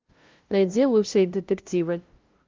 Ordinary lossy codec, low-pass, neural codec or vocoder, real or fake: Opus, 32 kbps; 7.2 kHz; codec, 16 kHz, 0.5 kbps, FunCodec, trained on LibriTTS, 25 frames a second; fake